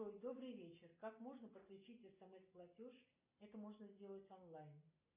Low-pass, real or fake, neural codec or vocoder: 3.6 kHz; real; none